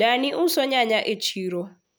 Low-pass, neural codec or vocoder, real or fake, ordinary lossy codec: none; none; real; none